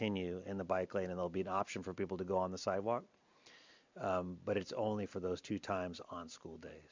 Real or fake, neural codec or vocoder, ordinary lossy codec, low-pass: real; none; MP3, 64 kbps; 7.2 kHz